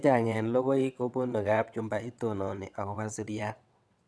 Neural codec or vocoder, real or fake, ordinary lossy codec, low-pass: vocoder, 22.05 kHz, 80 mel bands, WaveNeXt; fake; none; none